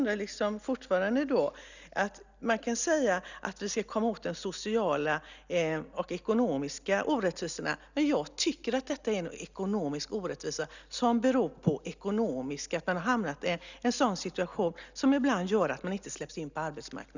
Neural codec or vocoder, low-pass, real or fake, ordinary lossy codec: none; 7.2 kHz; real; none